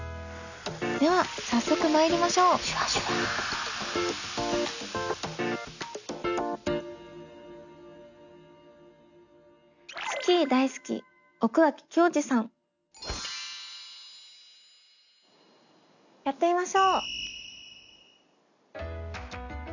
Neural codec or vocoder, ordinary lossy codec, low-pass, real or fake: none; none; 7.2 kHz; real